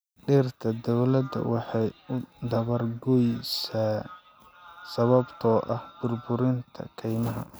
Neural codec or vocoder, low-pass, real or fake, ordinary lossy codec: none; none; real; none